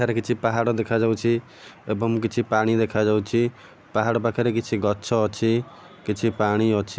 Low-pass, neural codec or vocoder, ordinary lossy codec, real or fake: none; none; none; real